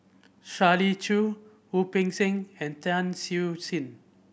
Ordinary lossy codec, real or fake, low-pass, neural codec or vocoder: none; real; none; none